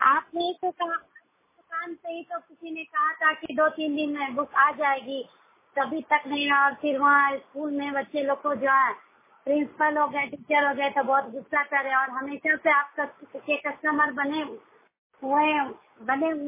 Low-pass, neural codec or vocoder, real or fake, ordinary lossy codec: 3.6 kHz; none; real; MP3, 16 kbps